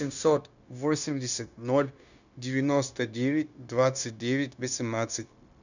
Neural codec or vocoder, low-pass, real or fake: codec, 16 kHz, 0.9 kbps, LongCat-Audio-Codec; 7.2 kHz; fake